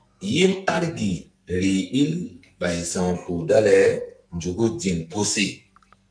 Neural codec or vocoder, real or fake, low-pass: codec, 44.1 kHz, 2.6 kbps, SNAC; fake; 9.9 kHz